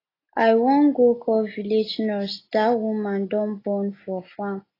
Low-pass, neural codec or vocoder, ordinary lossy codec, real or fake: 5.4 kHz; none; AAC, 24 kbps; real